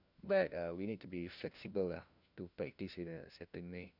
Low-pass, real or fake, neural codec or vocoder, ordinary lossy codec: 5.4 kHz; fake; codec, 16 kHz, 1 kbps, FunCodec, trained on LibriTTS, 50 frames a second; none